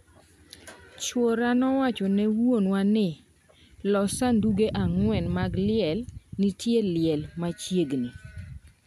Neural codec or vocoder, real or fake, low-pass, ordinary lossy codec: none; real; 14.4 kHz; MP3, 96 kbps